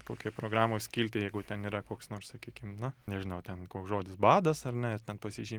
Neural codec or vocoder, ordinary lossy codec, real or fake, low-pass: none; Opus, 24 kbps; real; 19.8 kHz